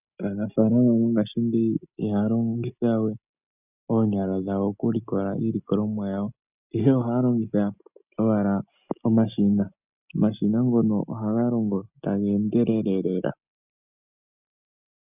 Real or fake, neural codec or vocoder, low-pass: real; none; 3.6 kHz